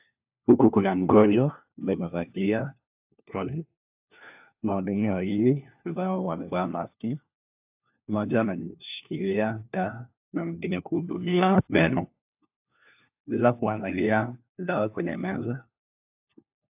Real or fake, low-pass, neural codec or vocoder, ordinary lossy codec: fake; 3.6 kHz; codec, 16 kHz, 1 kbps, FunCodec, trained on LibriTTS, 50 frames a second; AAC, 32 kbps